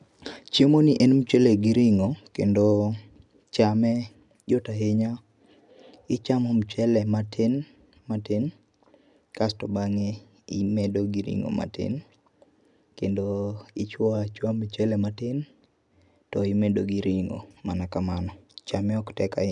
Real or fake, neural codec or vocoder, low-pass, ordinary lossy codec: real; none; 10.8 kHz; none